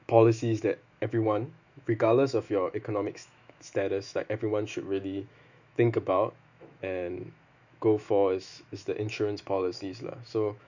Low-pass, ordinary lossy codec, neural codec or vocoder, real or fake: 7.2 kHz; none; autoencoder, 48 kHz, 128 numbers a frame, DAC-VAE, trained on Japanese speech; fake